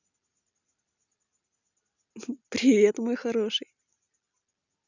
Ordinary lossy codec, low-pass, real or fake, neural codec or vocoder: none; 7.2 kHz; real; none